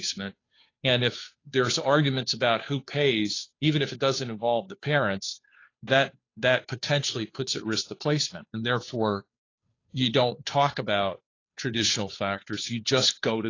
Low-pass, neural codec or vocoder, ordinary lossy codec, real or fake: 7.2 kHz; codec, 16 kHz, 2 kbps, FunCodec, trained on Chinese and English, 25 frames a second; AAC, 32 kbps; fake